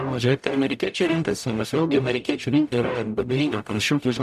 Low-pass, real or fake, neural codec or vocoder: 14.4 kHz; fake; codec, 44.1 kHz, 0.9 kbps, DAC